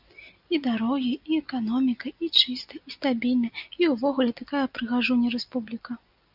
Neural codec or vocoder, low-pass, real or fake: none; 5.4 kHz; real